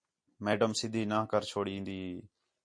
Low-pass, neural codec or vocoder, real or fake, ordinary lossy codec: 9.9 kHz; none; real; MP3, 48 kbps